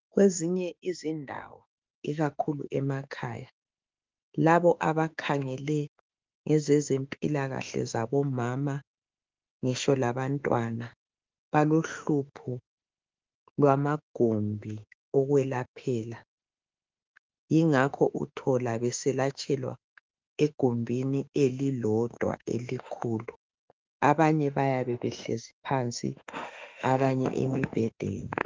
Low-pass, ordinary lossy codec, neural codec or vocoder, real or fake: 7.2 kHz; Opus, 32 kbps; autoencoder, 48 kHz, 32 numbers a frame, DAC-VAE, trained on Japanese speech; fake